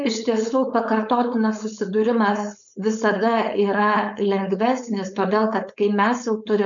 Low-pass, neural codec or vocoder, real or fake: 7.2 kHz; codec, 16 kHz, 4.8 kbps, FACodec; fake